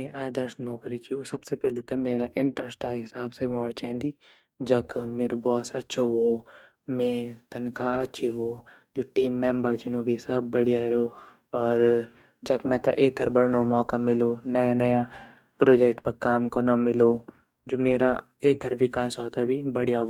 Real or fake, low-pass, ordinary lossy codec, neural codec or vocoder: fake; 19.8 kHz; MP3, 96 kbps; codec, 44.1 kHz, 2.6 kbps, DAC